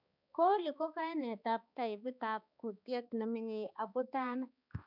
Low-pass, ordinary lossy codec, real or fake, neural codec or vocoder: 5.4 kHz; none; fake; codec, 16 kHz, 2 kbps, X-Codec, HuBERT features, trained on balanced general audio